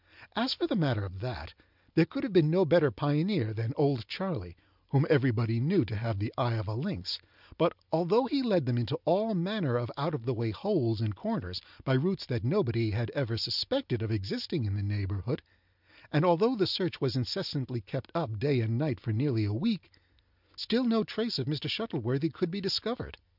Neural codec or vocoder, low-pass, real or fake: none; 5.4 kHz; real